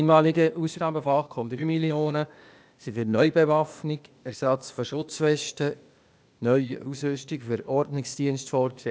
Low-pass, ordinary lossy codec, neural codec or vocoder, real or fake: none; none; codec, 16 kHz, 0.8 kbps, ZipCodec; fake